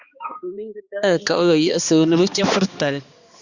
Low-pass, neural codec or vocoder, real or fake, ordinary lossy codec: 7.2 kHz; codec, 16 kHz, 2 kbps, X-Codec, HuBERT features, trained on balanced general audio; fake; Opus, 64 kbps